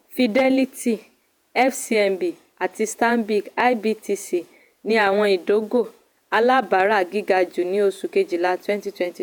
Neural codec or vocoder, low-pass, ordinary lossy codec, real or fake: vocoder, 48 kHz, 128 mel bands, Vocos; none; none; fake